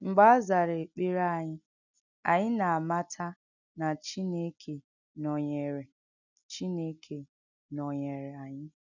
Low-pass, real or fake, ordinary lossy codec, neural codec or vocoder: 7.2 kHz; real; none; none